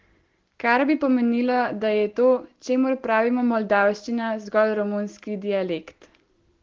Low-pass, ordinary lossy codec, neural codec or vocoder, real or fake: 7.2 kHz; Opus, 16 kbps; none; real